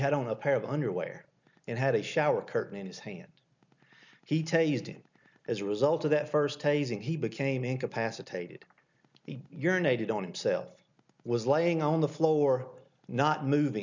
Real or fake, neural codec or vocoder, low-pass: real; none; 7.2 kHz